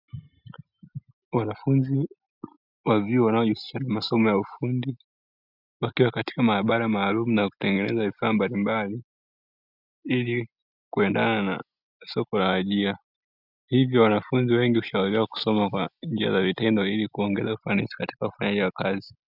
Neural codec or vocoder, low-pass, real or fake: none; 5.4 kHz; real